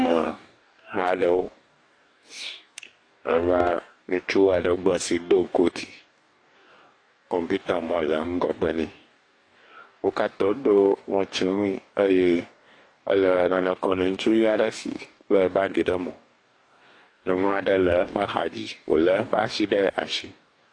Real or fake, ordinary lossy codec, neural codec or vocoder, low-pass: fake; AAC, 48 kbps; codec, 44.1 kHz, 2.6 kbps, DAC; 9.9 kHz